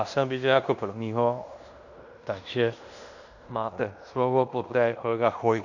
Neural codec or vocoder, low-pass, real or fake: codec, 16 kHz in and 24 kHz out, 0.9 kbps, LongCat-Audio-Codec, fine tuned four codebook decoder; 7.2 kHz; fake